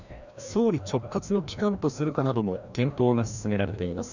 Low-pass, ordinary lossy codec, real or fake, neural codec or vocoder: 7.2 kHz; none; fake; codec, 16 kHz, 1 kbps, FreqCodec, larger model